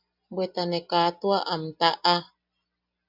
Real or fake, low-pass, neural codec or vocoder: real; 5.4 kHz; none